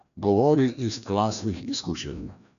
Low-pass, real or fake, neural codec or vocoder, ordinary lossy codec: 7.2 kHz; fake; codec, 16 kHz, 1 kbps, FreqCodec, larger model; none